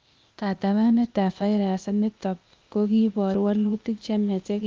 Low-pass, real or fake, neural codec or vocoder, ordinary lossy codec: 7.2 kHz; fake; codec, 16 kHz, 0.8 kbps, ZipCodec; Opus, 24 kbps